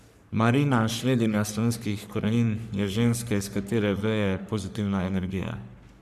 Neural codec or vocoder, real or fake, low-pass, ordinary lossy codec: codec, 44.1 kHz, 3.4 kbps, Pupu-Codec; fake; 14.4 kHz; none